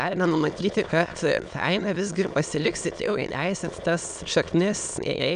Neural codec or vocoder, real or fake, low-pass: autoencoder, 22.05 kHz, a latent of 192 numbers a frame, VITS, trained on many speakers; fake; 9.9 kHz